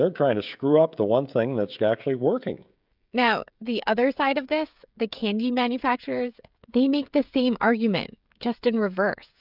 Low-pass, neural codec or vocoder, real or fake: 5.4 kHz; codec, 16 kHz, 16 kbps, FreqCodec, smaller model; fake